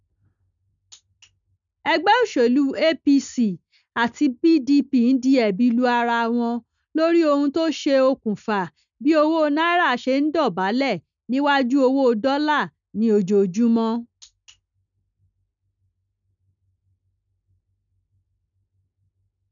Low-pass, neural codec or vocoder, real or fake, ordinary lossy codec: 7.2 kHz; none; real; none